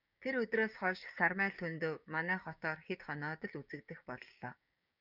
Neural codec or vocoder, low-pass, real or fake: codec, 16 kHz, 6 kbps, DAC; 5.4 kHz; fake